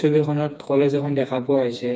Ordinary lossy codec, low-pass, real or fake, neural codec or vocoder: none; none; fake; codec, 16 kHz, 2 kbps, FreqCodec, smaller model